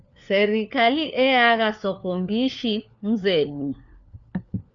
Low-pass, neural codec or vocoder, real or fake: 7.2 kHz; codec, 16 kHz, 4 kbps, FunCodec, trained on LibriTTS, 50 frames a second; fake